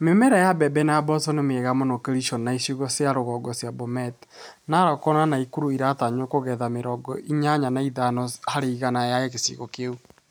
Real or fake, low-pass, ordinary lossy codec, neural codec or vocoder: real; none; none; none